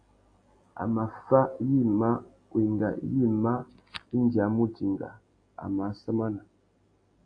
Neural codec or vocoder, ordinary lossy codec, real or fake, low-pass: none; AAC, 48 kbps; real; 9.9 kHz